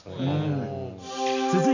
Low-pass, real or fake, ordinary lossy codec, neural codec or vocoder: 7.2 kHz; real; none; none